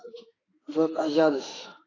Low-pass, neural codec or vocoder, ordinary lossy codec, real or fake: 7.2 kHz; autoencoder, 48 kHz, 32 numbers a frame, DAC-VAE, trained on Japanese speech; AAC, 32 kbps; fake